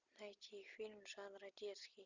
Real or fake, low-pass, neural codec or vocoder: real; 7.2 kHz; none